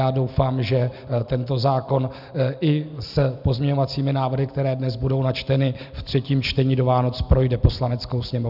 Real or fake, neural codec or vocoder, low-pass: real; none; 5.4 kHz